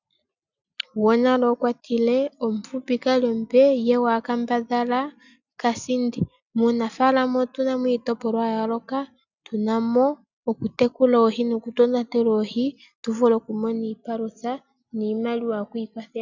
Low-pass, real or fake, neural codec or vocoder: 7.2 kHz; real; none